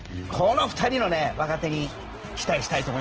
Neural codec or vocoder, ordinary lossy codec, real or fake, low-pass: none; Opus, 16 kbps; real; 7.2 kHz